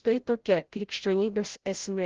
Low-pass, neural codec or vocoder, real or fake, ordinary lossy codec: 7.2 kHz; codec, 16 kHz, 0.5 kbps, FreqCodec, larger model; fake; Opus, 16 kbps